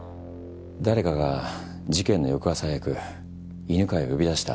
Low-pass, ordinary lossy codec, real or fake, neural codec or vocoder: none; none; real; none